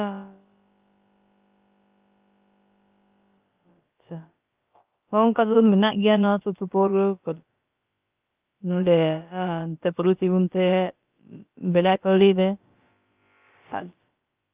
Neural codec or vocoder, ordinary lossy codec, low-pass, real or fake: codec, 16 kHz, about 1 kbps, DyCAST, with the encoder's durations; Opus, 24 kbps; 3.6 kHz; fake